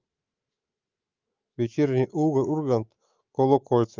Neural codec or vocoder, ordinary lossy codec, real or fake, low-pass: autoencoder, 48 kHz, 128 numbers a frame, DAC-VAE, trained on Japanese speech; Opus, 24 kbps; fake; 7.2 kHz